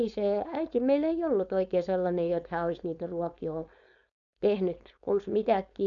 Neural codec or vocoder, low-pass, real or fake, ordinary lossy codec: codec, 16 kHz, 4.8 kbps, FACodec; 7.2 kHz; fake; none